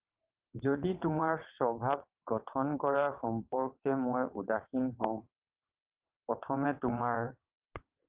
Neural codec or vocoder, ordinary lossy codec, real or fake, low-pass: vocoder, 22.05 kHz, 80 mel bands, WaveNeXt; Opus, 24 kbps; fake; 3.6 kHz